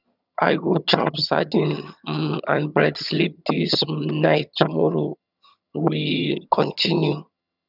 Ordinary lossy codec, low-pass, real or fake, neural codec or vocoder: none; 5.4 kHz; fake; vocoder, 22.05 kHz, 80 mel bands, HiFi-GAN